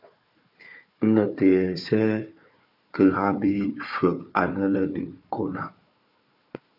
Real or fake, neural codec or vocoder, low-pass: fake; codec, 16 kHz, 4 kbps, FunCodec, trained on Chinese and English, 50 frames a second; 5.4 kHz